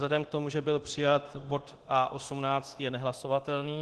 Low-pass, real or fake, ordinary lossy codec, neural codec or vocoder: 10.8 kHz; fake; Opus, 16 kbps; codec, 24 kHz, 0.9 kbps, DualCodec